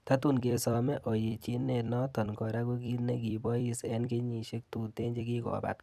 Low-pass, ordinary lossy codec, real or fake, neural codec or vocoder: 14.4 kHz; none; fake; vocoder, 44.1 kHz, 128 mel bands every 256 samples, BigVGAN v2